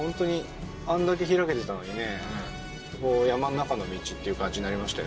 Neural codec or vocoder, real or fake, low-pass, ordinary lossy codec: none; real; none; none